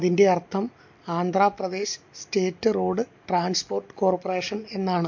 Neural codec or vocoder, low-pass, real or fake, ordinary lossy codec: none; 7.2 kHz; real; MP3, 48 kbps